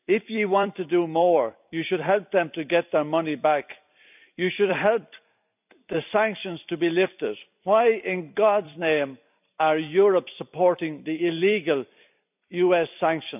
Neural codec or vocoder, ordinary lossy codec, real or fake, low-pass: vocoder, 44.1 kHz, 128 mel bands every 256 samples, BigVGAN v2; none; fake; 3.6 kHz